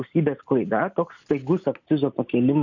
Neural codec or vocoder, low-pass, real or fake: none; 7.2 kHz; real